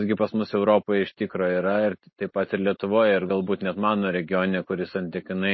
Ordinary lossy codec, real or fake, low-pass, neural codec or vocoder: MP3, 24 kbps; real; 7.2 kHz; none